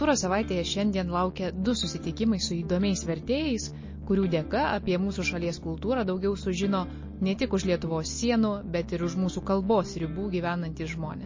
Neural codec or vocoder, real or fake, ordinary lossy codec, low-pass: none; real; MP3, 32 kbps; 7.2 kHz